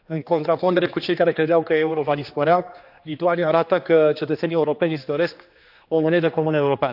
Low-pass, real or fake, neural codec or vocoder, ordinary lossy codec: 5.4 kHz; fake; codec, 16 kHz, 2 kbps, X-Codec, HuBERT features, trained on general audio; AAC, 48 kbps